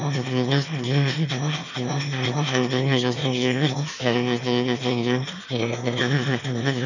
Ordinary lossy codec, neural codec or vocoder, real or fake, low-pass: none; autoencoder, 22.05 kHz, a latent of 192 numbers a frame, VITS, trained on one speaker; fake; 7.2 kHz